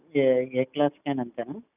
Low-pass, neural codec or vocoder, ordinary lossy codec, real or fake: 3.6 kHz; none; none; real